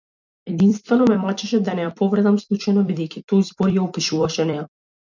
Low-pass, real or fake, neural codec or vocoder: 7.2 kHz; fake; vocoder, 44.1 kHz, 128 mel bands every 256 samples, BigVGAN v2